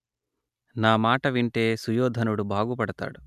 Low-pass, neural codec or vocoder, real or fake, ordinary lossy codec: 14.4 kHz; none; real; none